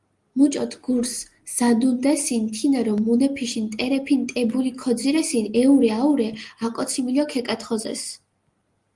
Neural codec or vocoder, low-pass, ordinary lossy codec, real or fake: none; 10.8 kHz; Opus, 24 kbps; real